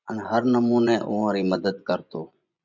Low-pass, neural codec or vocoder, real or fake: 7.2 kHz; vocoder, 24 kHz, 100 mel bands, Vocos; fake